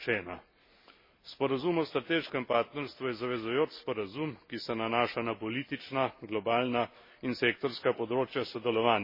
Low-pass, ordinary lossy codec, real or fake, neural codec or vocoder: 5.4 kHz; MP3, 24 kbps; real; none